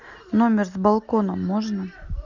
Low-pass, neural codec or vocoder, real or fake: 7.2 kHz; none; real